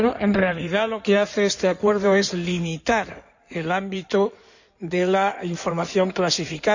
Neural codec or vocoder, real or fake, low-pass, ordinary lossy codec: codec, 16 kHz in and 24 kHz out, 2.2 kbps, FireRedTTS-2 codec; fake; 7.2 kHz; none